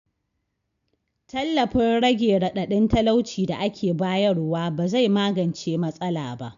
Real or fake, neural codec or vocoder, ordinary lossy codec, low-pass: real; none; none; 7.2 kHz